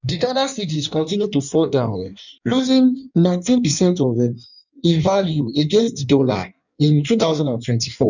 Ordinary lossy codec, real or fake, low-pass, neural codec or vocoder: none; fake; 7.2 kHz; codec, 16 kHz in and 24 kHz out, 1.1 kbps, FireRedTTS-2 codec